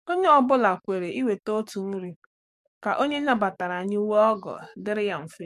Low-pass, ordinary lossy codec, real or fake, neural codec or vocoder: 14.4 kHz; MP3, 64 kbps; fake; codec, 44.1 kHz, 7.8 kbps, DAC